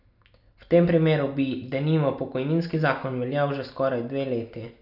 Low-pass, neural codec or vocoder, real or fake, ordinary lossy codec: 5.4 kHz; none; real; none